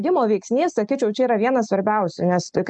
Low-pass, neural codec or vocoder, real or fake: 9.9 kHz; none; real